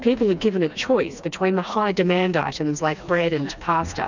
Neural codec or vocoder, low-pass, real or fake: codec, 16 kHz, 2 kbps, FreqCodec, smaller model; 7.2 kHz; fake